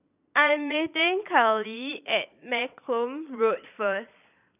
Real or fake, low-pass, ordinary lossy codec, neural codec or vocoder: fake; 3.6 kHz; none; vocoder, 22.05 kHz, 80 mel bands, Vocos